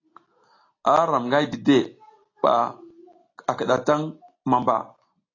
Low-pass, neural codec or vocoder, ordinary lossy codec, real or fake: 7.2 kHz; none; AAC, 32 kbps; real